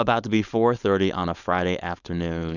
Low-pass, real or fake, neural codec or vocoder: 7.2 kHz; fake; codec, 16 kHz, 4.8 kbps, FACodec